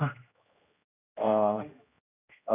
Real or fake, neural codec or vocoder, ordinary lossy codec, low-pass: fake; codec, 16 kHz, 1 kbps, X-Codec, HuBERT features, trained on general audio; none; 3.6 kHz